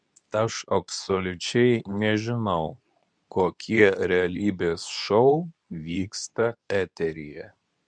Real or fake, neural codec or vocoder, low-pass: fake; codec, 24 kHz, 0.9 kbps, WavTokenizer, medium speech release version 2; 9.9 kHz